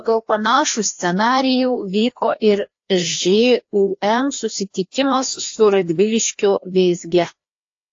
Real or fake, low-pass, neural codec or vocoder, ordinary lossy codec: fake; 7.2 kHz; codec, 16 kHz, 1 kbps, FreqCodec, larger model; AAC, 48 kbps